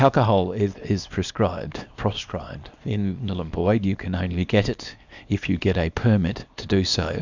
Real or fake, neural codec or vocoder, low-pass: fake; codec, 24 kHz, 0.9 kbps, WavTokenizer, small release; 7.2 kHz